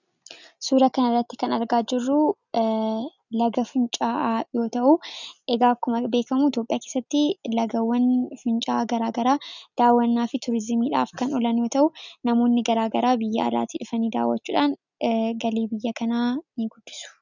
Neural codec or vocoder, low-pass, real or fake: none; 7.2 kHz; real